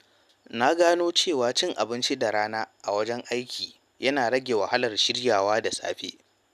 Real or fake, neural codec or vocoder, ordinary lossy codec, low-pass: real; none; none; 14.4 kHz